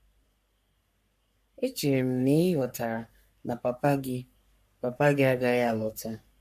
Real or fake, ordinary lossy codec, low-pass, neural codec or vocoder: fake; MP3, 64 kbps; 14.4 kHz; codec, 44.1 kHz, 3.4 kbps, Pupu-Codec